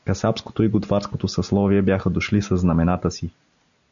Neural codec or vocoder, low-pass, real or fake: none; 7.2 kHz; real